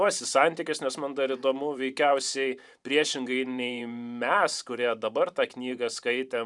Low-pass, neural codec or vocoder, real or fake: 10.8 kHz; vocoder, 44.1 kHz, 128 mel bands every 512 samples, BigVGAN v2; fake